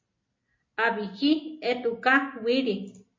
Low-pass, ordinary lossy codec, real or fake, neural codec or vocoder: 7.2 kHz; MP3, 32 kbps; real; none